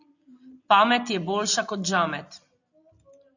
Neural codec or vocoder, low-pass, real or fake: none; 7.2 kHz; real